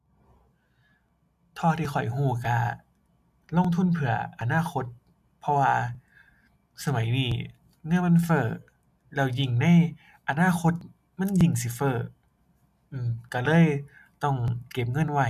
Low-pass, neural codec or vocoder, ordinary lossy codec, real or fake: 14.4 kHz; none; none; real